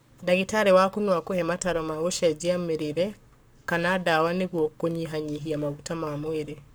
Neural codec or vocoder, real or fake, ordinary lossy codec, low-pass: codec, 44.1 kHz, 7.8 kbps, Pupu-Codec; fake; none; none